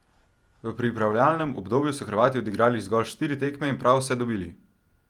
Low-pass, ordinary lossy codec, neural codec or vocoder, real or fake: 19.8 kHz; Opus, 32 kbps; vocoder, 48 kHz, 128 mel bands, Vocos; fake